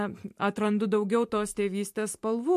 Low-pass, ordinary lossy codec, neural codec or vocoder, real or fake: 14.4 kHz; MP3, 64 kbps; vocoder, 44.1 kHz, 128 mel bands every 512 samples, BigVGAN v2; fake